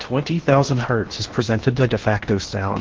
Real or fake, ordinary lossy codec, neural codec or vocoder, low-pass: fake; Opus, 16 kbps; codec, 16 kHz in and 24 kHz out, 0.8 kbps, FocalCodec, streaming, 65536 codes; 7.2 kHz